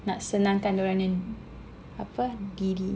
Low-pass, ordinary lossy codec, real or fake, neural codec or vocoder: none; none; real; none